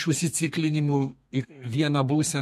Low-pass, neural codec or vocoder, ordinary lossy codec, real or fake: 14.4 kHz; codec, 32 kHz, 1.9 kbps, SNAC; MP3, 64 kbps; fake